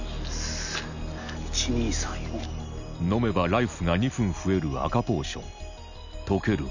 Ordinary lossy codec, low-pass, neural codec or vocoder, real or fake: none; 7.2 kHz; none; real